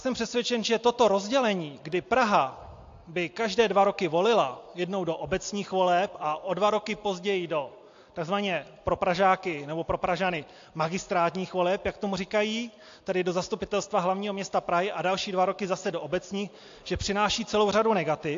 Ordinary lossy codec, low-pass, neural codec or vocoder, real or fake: AAC, 48 kbps; 7.2 kHz; none; real